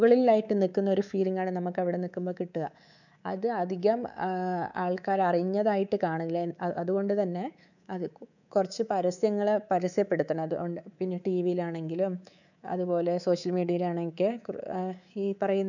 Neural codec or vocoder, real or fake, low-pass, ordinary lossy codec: codec, 24 kHz, 3.1 kbps, DualCodec; fake; 7.2 kHz; none